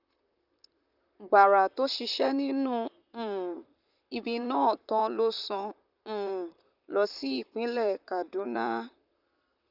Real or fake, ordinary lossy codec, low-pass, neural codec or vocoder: fake; none; 5.4 kHz; vocoder, 44.1 kHz, 128 mel bands, Pupu-Vocoder